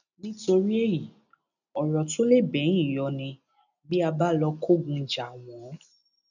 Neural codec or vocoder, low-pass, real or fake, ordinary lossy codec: none; 7.2 kHz; real; none